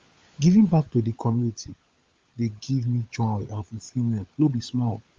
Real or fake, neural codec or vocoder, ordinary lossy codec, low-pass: fake; codec, 16 kHz, 16 kbps, FunCodec, trained on LibriTTS, 50 frames a second; Opus, 32 kbps; 7.2 kHz